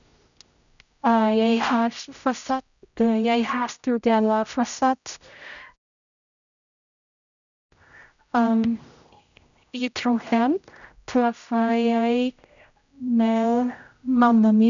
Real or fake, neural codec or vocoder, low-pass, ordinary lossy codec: fake; codec, 16 kHz, 0.5 kbps, X-Codec, HuBERT features, trained on general audio; 7.2 kHz; none